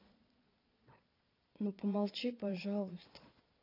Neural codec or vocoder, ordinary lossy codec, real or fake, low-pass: vocoder, 22.05 kHz, 80 mel bands, Vocos; AAC, 32 kbps; fake; 5.4 kHz